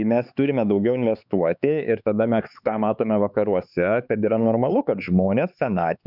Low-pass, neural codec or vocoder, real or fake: 5.4 kHz; codec, 16 kHz, 4 kbps, X-Codec, HuBERT features, trained on LibriSpeech; fake